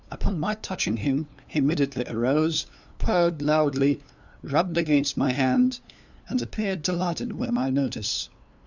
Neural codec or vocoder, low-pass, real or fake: codec, 16 kHz, 2 kbps, FunCodec, trained on LibriTTS, 25 frames a second; 7.2 kHz; fake